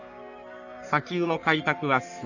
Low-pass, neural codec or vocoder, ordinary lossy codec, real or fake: 7.2 kHz; codec, 44.1 kHz, 3.4 kbps, Pupu-Codec; none; fake